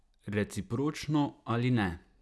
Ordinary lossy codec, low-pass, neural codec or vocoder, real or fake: none; none; none; real